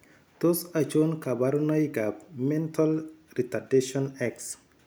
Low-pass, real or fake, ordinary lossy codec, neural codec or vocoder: none; real; none; none